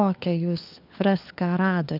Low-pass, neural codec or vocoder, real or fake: 5.4 kHz; codec, 16 kHz, 4 kbps, FunCodec, trained on LibriTTS, 50 frames a second; fake